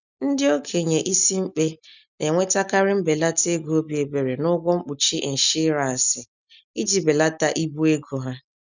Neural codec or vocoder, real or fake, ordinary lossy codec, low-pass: none; real; none; 7.2 kHz